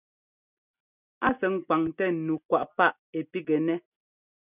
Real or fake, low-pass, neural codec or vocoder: real; 3.6 kHz; none